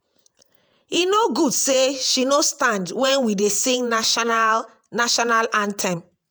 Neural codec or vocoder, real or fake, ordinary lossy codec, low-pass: vocoder, 48 kHz, 128 mel bands, Vocos; fake; none; none